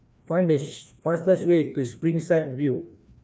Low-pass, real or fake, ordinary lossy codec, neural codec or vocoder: none; fake; none; codec, 16 kHz, 1 kbps, FreqCodec, larger model